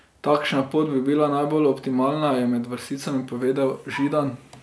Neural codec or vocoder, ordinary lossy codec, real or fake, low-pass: none; none; real; none